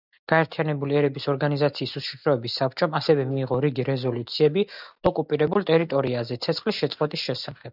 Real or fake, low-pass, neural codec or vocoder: real; 5.4 kHz; none